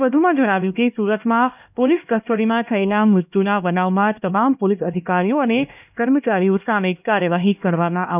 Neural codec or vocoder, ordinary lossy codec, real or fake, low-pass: codec, 16 kHz, 1 kbps, X-Codec, HuBERT features, trained on LibriSpeech; none; fake; 3.6 kHz